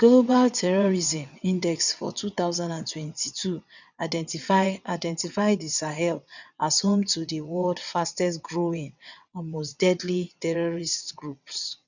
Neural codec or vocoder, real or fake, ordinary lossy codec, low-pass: vocoder, 22.05 kHz, 80 mel bands, WaveNeXt; fake; none; 7.2 kHz